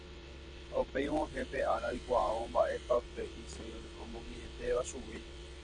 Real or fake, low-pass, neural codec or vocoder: fake; 9.9 kHz; vocoder, 22.05 kHz, 80 mel bands, WaveNeXt